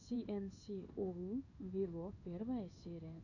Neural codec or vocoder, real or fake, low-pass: codec, 16 kHz in and 24 kHz out, 1 kbps, XY-Tokenizer; fake; 7.2 kHz